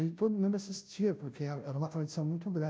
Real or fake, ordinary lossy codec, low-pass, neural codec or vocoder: fake; none; none; codec, 16 kHz, 0.5 kbps, FunCodec, trained on Chinese and English, 25 frames a second